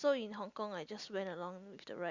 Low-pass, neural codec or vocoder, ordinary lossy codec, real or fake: 7.2 kHz; none; Opus, 64 kbps; real